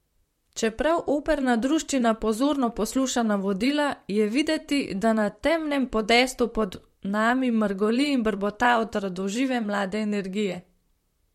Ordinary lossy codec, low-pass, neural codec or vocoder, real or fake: MP3, 64 kbps; 19.8 kHz; vocoder, 44.1 kHz, 128 mel bands, Pupu-Vocoder; fake